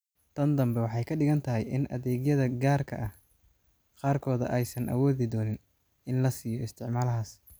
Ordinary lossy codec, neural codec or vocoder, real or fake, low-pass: none; none; real; none